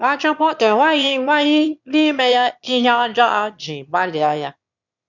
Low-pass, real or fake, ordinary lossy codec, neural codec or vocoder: 7.2 kHz; fake; none; autoencoder, 22.05 kHz, a latent of 192 numbers a frame, VITS, trained on one speaker